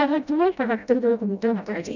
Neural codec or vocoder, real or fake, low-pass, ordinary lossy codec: codec, 16 kHz, 0.5 kbps, FreqCodec, smaller model; fake; 7.2 kHz; none